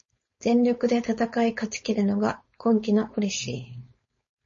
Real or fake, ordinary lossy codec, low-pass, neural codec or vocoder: fake; MP3, 32 kbps; 7.2 kHz; codec, 16 kHz, 4.8 kbps, FACodec